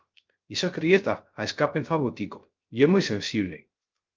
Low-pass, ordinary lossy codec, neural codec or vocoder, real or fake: 7.2 kHz; Opus, 32 kbps; codec, 16 kHz, 0.3 kbps, FocalCodec; fake